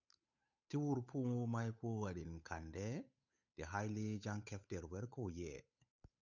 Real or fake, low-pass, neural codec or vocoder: fake; 7.2 kHz; codec, 16 kHz, 8 kbps, FunCodec, trained on Chinese and English, 25 frames a second